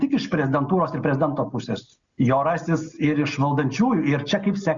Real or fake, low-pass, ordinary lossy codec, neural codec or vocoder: real; 7.2 kHz; Opus, 64 kbps; none